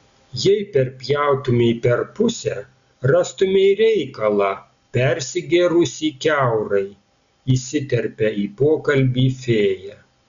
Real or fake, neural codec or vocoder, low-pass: real; none; 7.2 kHz